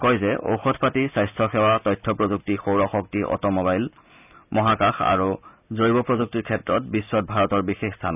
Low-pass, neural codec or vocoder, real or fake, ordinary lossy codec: 3.6 kHz; none; real; none